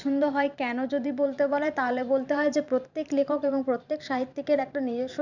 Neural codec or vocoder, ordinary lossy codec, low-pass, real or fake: vocoder, 44.1 kHz, 128 mel bands every 512 samples, BigVGAN v2; none; 7.2 kHz; fake